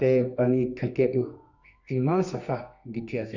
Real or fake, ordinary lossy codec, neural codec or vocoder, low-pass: fake; none; codec, 24 kHz, 0.9 kbps, WavTokenizer, medium music audio release; 7.2 kHz